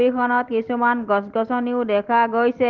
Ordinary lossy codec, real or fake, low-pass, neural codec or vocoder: Opus, 16 kbps; real; 7.2 kHz; none